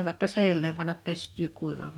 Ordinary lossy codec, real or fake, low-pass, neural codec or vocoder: none; fake; 19.8 kHz; codec, 44.1 kHz, 2.6 kbps, DAC